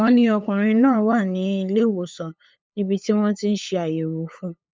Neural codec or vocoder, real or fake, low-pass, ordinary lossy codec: codec, 16 kHz, 8 kbps, FunCodec, trained on LibriTTS, 25 frames a second; fake; none; none